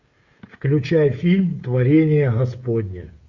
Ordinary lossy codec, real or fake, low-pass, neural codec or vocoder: Opus, 64 kbps; fake; 7.2 kHz; codec, 16 kHz, 16 kbps, FreqCodec, smaller model